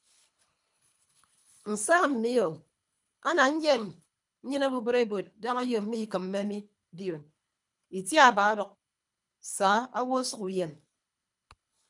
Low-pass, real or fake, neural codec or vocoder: 10.8 kHz; fake; codec, 24 kHz, 3 kbps, HILCodec